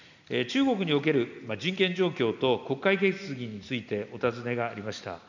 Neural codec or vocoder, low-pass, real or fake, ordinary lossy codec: none; 7.2 kHz; real; none